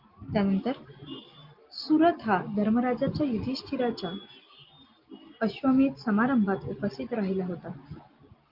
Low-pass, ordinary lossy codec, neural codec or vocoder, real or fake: 5.4 kHz; Opus, 24 kbps; none; real